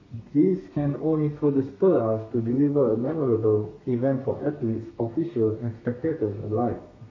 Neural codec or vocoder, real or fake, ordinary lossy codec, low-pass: codec, 32 kHz, 1.9 kbps, SNAC; fake; MP3, 32 kbps; 7.2 kHz